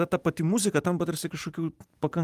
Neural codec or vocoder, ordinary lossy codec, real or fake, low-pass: vocoder, 44.1 kHz, 128 mel bands every 512 samples, BigVGAN v2; Opus, 32 kbps; fake; 14.4 kHz